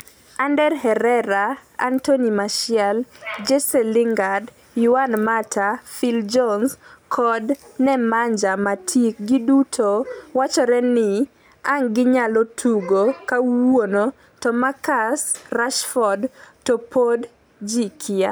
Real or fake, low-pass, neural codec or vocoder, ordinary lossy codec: real; none; none; none